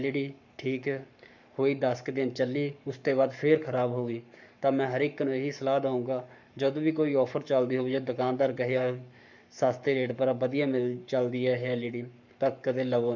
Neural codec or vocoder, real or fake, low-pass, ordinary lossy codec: codec, 44.1 kHz, 7.8 kbps, Pupu-Codec; fake; 7.2 kHz; none